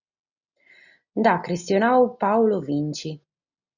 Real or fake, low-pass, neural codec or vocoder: real; 7.2 kHz; none